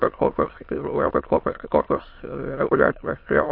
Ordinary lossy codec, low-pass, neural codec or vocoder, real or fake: MP3, 32 kbps; 5.4 kHz; autoencoder, 22.05 kHz, a latent of 192 numbers a frame, VITS, trained on many speakers; fake